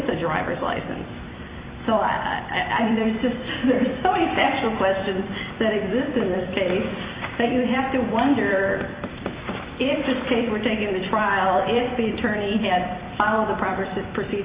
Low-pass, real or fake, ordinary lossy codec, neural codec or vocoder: 3.6 kHz; fake; Opus, 64 kbps; vocoder, 44.1 kHz, 128 mel bands every 256 samples, BigVGAN v2